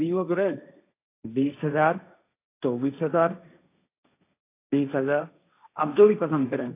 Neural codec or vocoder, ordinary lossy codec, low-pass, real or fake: codec, 16 kHz, 1.1 kbps, Voila-Tokenizer; AAC, 24 kbps; 3.6 kHz; fake